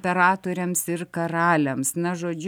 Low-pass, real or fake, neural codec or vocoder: 19.8 kHz; real; none